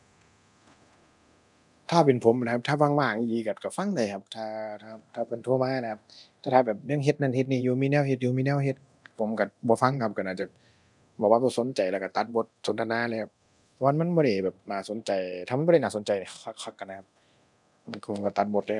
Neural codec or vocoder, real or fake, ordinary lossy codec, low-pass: codec, 24 kHz, 0.9 kbps, DualCodec; fake; none; 10.8 kHz